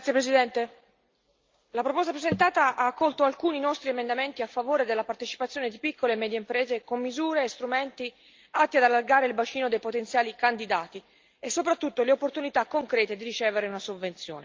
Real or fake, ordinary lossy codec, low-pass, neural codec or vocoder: real; Opus, 24 kbps; 7.2 kHz; none